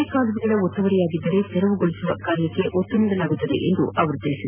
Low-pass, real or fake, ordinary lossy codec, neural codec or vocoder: 3.6 kHz; real; none; none